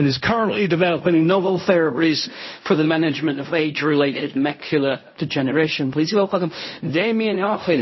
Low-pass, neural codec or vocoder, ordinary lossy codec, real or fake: 7.2 kHz; codec, 16 kHz in and 24 kHz out, 0.4 kbps, LongCat-Audio-Codec, fine tuned four codebook decoder; MP3, 24 kbps; fake